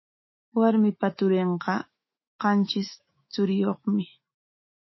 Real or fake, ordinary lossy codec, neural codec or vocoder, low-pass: fake; MP3, 24 kbps; autoencoder, 48 kHz, 128 numbers a frame, DAC-VAE, trained on Japanese speech; 7.2 kHz